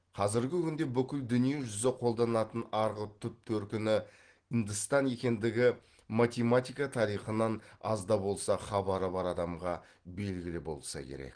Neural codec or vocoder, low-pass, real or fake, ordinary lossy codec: none; 9.9 kHz; real; Opus, 16 kbps